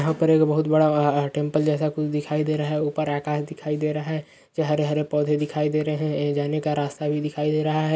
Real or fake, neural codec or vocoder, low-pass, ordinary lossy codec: real; none; none; none